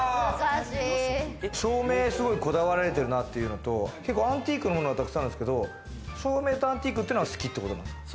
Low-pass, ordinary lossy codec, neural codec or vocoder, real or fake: none; none; none; real